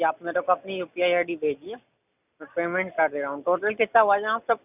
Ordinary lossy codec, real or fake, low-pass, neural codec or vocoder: none; real; 3.6 kHz; none